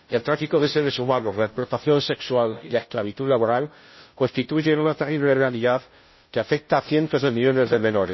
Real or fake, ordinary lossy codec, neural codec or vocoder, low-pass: fake; MP3, 24 kbps; codec, 16 kHz, 0.5 kbps, FunCodec, trained on Chinese and English, 25 frames a second; 7.2 kHz